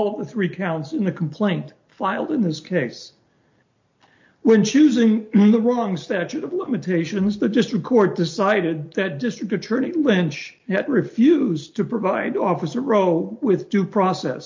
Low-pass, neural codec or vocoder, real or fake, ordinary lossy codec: 7.2 kHz; none; real; MP3, 48 kbps